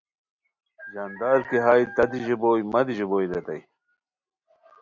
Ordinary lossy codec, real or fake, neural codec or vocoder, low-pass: Opus, 64 kbps; real; none; 7.2 kHz